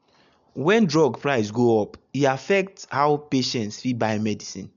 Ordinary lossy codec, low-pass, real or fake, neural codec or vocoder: none; 7.2 kHz; real; none